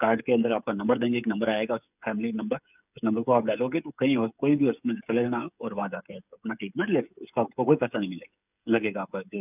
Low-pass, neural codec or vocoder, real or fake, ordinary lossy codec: 3.6 kHz; codec, 16 kHz, 8 kbps, FreqCodec, smaller model; fake; none